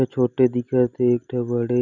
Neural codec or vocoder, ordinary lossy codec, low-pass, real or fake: none; none; 7.2 kHz; real